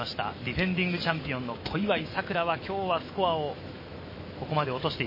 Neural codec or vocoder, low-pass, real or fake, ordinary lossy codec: autoencoder, 48 kHz, 128 numbers a frame, DAC-VAE, trained on Japanese speech; 5.4 kHz; fake; MP3, 24 kbps